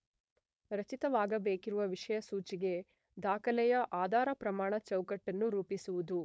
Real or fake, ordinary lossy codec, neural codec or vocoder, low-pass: fake; none; codec, 16 kHz, 4.8 kbps, FACodec; none